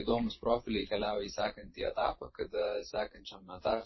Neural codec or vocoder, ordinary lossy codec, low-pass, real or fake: vocoder, 22.05 kHz, 80 mel bands, WaveNeXt; MP3, 24 kbps; 7.2 kHz; fake